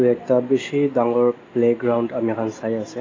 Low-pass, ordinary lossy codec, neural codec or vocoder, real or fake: 7.2 kHz; AAC, 32 kbps; none; real